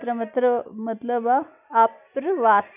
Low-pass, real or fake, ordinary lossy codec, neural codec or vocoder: 3.6 kHz; real; none; none